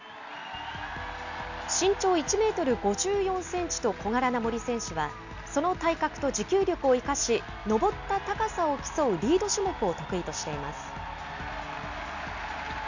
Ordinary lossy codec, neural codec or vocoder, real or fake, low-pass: none; none; real; 7.2 kHz